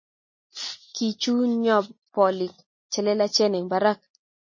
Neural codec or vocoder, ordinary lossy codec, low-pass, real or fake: none; MP3, 32 kbps; 7.2 kHz; real